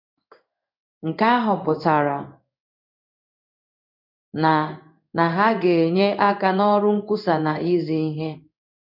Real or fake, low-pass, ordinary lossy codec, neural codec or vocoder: fake; 5.4 kHz; none; codec, 16 kHz in and 24 kHz out, 1 kbps, XY-Tokenizer